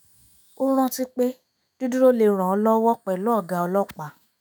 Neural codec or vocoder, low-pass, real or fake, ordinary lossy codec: autoencoder, 48 kHz, 128 numbers a frame, DAC-VAE, trained on Japanese speech; none; fake; none